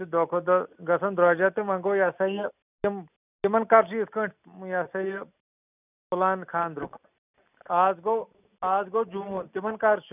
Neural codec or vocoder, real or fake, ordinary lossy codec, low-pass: none; real; none; 3.6 kHz